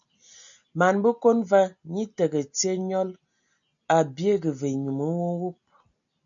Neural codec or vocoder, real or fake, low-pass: none; real; 7.2 kHz